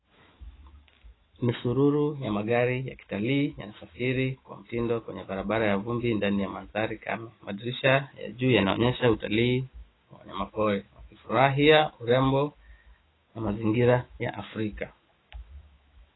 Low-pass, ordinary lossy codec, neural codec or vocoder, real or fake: 7.2 kHz; AAC, 16 kbps; autoencoder, 48 kHz, 128 numbers a frame, DAC-VAE, trained on Japanese speech; fake